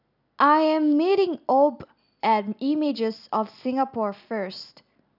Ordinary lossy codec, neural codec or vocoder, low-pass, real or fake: none; none; 5.4 kHz; real